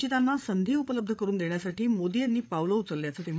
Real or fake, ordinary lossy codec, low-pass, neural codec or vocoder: fake; none; none; codec, 16 kHz, 8 kbps, FreqCodec, larger model